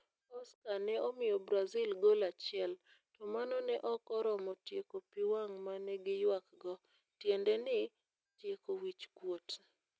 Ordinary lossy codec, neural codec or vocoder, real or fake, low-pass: none; none; real; none